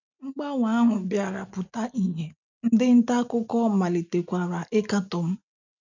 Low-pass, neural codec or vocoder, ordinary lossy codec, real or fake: 7.2 kHz; none; none; real